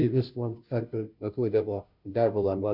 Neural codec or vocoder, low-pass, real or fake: codec, 16 kHz, 0.5 kbps, FunCodec, trained on Chinese and English, 25 frames a second; 5.4 kHz; fake